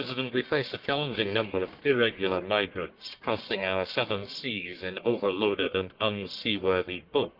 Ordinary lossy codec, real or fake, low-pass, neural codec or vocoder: Opus, 32 kbps; fake; 5.4 kHz; codec, 44.1 kHz, 1.7 kbps, Pupu-Codec